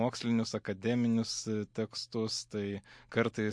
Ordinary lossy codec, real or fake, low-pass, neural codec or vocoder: MP3, 48 kbps; real; 9.9 kHz; none